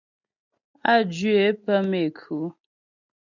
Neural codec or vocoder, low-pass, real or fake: none; 7.2 kHz; real